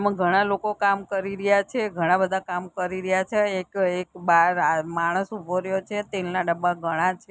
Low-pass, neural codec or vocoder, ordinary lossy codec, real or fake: none; none; none; real